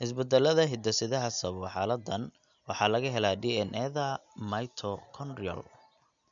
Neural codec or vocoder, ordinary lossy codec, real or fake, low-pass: none; none; real; 7.2 kHz